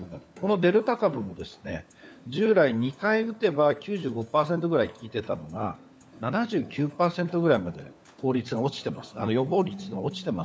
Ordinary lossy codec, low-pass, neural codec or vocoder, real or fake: none; none; codec, 16 kHz, 4 kbps, FunCodec, trained on LibriTTS, 50 frames a second; fake